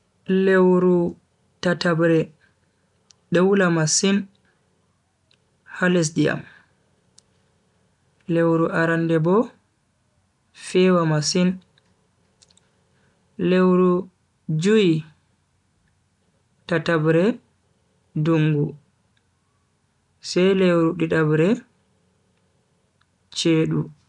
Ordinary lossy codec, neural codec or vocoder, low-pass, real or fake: none; none; 10.8 kHz; real